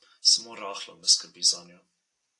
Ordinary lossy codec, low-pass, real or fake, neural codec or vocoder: AAC, 48 kbps; 10.8 kHz; real; none